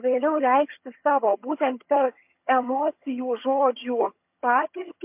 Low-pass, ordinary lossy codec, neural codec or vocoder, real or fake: 3.6 kHz; MP3, 32 kbps; vocoder, 22.05 kHz, 80 mel bands, HiFi-GAN; fake